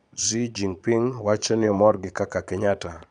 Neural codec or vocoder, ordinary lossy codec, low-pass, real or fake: vocoder, 22.05 kHz, 80 mel bands, Vocos; none; 9.9 kHz; fake